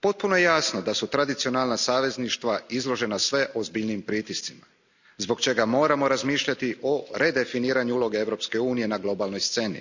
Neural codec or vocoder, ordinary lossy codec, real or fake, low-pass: none; none; real; 7.2 kHz